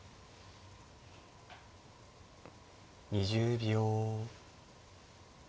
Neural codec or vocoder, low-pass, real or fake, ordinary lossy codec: none; none; real; none